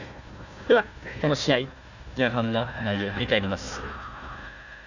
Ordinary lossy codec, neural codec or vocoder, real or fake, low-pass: none; codec, 16 kHz, 1 kbps, FunCodec, trained on Chinese and English, 50 frames a second; fake; 7.2 kHz